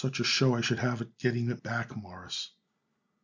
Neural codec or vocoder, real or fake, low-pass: none; real; 7.2 kHz